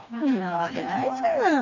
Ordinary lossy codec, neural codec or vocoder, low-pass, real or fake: none; codec, 16 kHz, 2 kbps, FreqCodec, smaller model; 7.2 kHz; fake